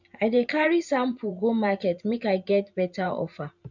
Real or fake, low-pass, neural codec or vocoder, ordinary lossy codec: fake; 7.2 kHz; vocoder, 44.1 kHz, 128 mel bands every 512 samples, BigVGAN v2; none